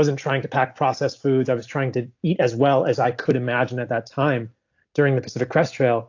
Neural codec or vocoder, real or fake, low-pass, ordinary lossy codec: none; real; 7.2 kHz; AAC, 48 kbps